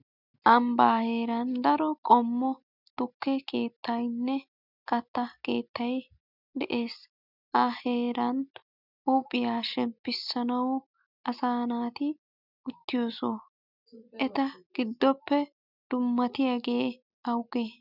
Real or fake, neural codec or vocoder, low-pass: real; none; 5.4 kHz